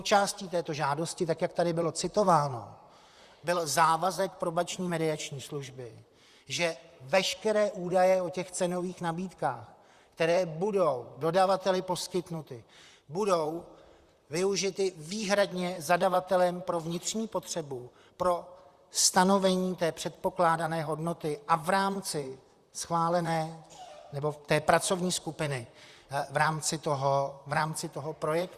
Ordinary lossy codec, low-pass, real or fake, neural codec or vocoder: Opus, 64 kbps; 14.4 kHz; fake; vocoder, 44.1 kHz, 128 mel bands, Pupu-Vocoder